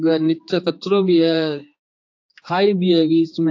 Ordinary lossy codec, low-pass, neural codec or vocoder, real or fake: AAC, 48 kbps; 7.2 kHz; codec, 16 kHz, 4 kbps, X-Codec, HuBERT features, trained on general audio; fake